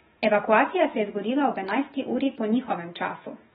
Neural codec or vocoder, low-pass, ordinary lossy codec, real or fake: autoencoder, 48 kHz, 128 numbers a frame, DAC-VAE, trained on Japanese speech; 19.8 kHz; AAC, 16 kbps; fake